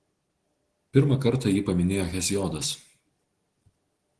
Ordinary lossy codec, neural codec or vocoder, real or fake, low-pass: Opus, 16 kbps; none; real; 10.8 kHz